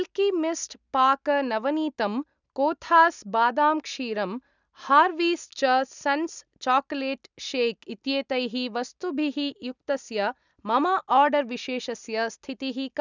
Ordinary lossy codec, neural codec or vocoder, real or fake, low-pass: none; none; real; 7.2 kHz